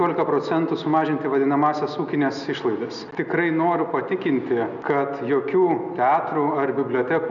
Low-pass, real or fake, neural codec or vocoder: 7.2 kHz; real; none